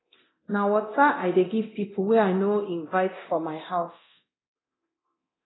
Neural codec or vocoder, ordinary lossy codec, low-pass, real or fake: codec, 24 kHz, 0.9 kbps, DualCodec; AAC, 16 kbps; 7.2 kHz; fake